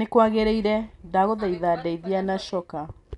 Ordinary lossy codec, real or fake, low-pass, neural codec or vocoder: none; real; 10.8 kHz; none